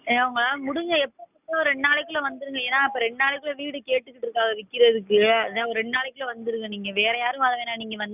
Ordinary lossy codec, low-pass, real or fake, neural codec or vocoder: none; 3.6 kHz; real; none